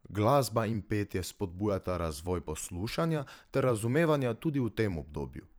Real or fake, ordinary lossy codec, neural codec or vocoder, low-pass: fake; none; vocoder, 44.1 kHz, 128 mel bands every 512 samples, BigVGAN v2; none